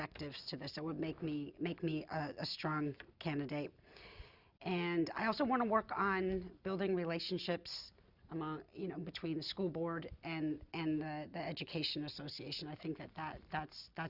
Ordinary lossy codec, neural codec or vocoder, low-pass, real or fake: Opus, 64 kbps; none; 5.4 kHz; real